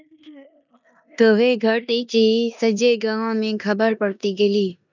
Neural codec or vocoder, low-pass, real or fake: codec, 16 kHz in and 24 kHz out, 0.9 kbps, LongCat-Audio-Codec, four codebook decoder; 7.2 kHz; fake